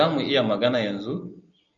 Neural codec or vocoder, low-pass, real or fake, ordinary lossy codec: none; 7.2 kHz; real; MP3, 96 kbps